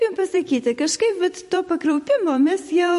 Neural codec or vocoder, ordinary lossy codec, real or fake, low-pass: vocoder, 44.1 kHz, 128 mel bands every 256 samples, BigVGAN v2; MP3, 48 kbps; fake; 14.4 kHz